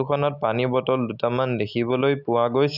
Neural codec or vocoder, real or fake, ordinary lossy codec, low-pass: none; real; none; 5.4 kHz